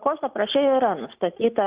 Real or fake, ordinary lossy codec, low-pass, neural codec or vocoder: real; Opus, 64 kbps; 3.6 kHz; none